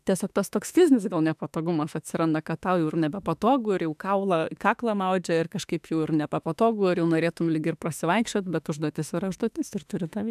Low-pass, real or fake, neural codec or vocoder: 14.4 kHz; fake; autoencoder, 48 kHz, 32 numbers a frame, DAC-VAE, trained on Japanese speech